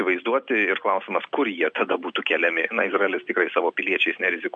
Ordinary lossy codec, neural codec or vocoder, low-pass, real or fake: MP3, 64 kbps; none; 9.9 kHz; real